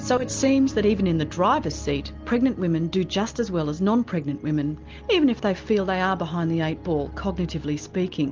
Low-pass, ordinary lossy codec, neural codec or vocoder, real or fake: 7.2 kHz; Opus, 24 kbps; none; real